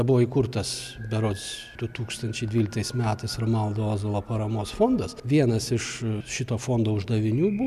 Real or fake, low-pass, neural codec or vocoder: real; 14.4 kHz; none